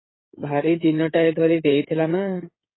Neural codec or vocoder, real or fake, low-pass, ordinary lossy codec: codec, 16 kHz in and 24 kHz out, 2.2 kbps, FireRedTTS-2 codec; fake; 7.2 kHz; AAC, 16 kbps